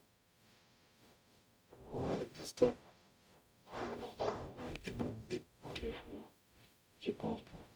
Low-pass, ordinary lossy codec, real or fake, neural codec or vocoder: none; none; fake; codec, 44.1 kHz, 0.9 kbps, DAC